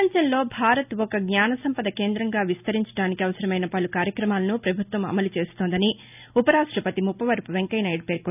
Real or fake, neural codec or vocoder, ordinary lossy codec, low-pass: real; none; none; 3.6 kHz